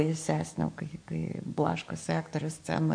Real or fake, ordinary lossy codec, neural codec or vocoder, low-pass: fake; MP3, 48 kbps; codec, 44.1 kHz, 7.8 kbps, DAC; 9.9 kHz